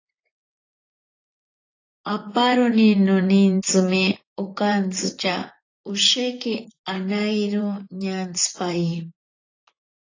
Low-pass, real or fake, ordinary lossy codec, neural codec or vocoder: 7.2 kHz; fake; AAC, 32 kbps; vocoder, 44.1 kHz, 128 mel bands, Pupu-Vocoder